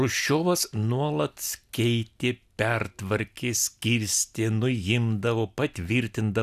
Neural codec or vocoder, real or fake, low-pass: none; real; 14.4 kHz